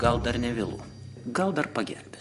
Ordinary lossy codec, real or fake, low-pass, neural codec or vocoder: MP3, 48 kbps; fake; 14.4 kHz; vocoder, 44.1 kHz, 128 mel bands every 512 samples, BigVGAN v2